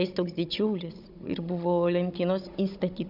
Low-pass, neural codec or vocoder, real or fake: 5.4 kHz; codec, 16 kHz, 16 kbps, FunCodec, trained on Chinese and English, 50 frames a second; fake